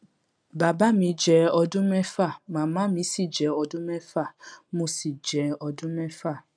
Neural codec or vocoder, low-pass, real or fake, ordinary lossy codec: none; 9.9 kHz; real; none